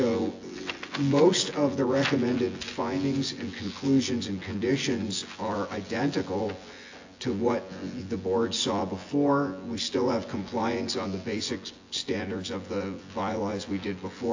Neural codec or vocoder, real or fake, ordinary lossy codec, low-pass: vocoder, 24 kHz, 100 mel bands, Vocos; fake; AAC, 48 kbps; 7.2 kHz